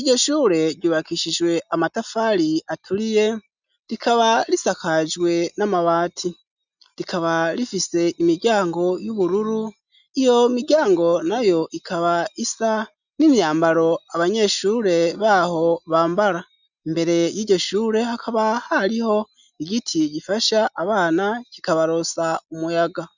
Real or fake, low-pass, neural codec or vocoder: real; 7.2 kHz; none